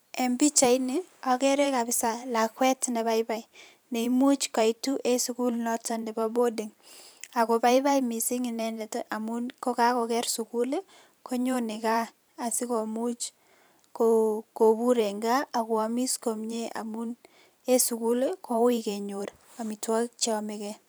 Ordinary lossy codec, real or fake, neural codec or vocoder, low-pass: none; fake; vocoder, 44.1 kHz, 128 mel bands every 512 samples, BigVGAN v2; none